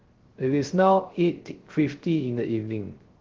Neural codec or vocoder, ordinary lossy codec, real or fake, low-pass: codec, 16 kHz, 0.3 kbps, FocalCodec; Opus, 16 kbps; fake; 7.2 kHz